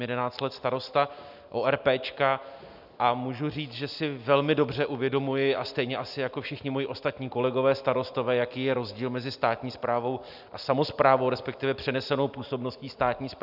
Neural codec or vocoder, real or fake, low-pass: none; real; 5.4 kHz